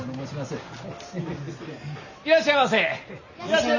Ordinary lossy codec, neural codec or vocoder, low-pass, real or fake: Opus, 64 kbps; none; 7.2 kHz; real